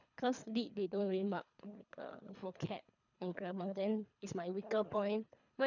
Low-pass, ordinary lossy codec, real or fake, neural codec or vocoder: 7.2 kHz; none; fake; codec, 24 kHz, 3 kbps, HILCodec